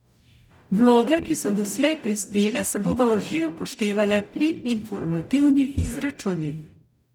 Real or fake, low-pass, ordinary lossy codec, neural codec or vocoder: fake; 19.8 kHz; none; codec, 44.1 kHz, 0.9 kbps, DAC